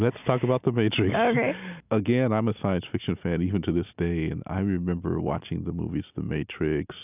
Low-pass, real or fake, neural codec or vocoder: 3.6 kHz; real; none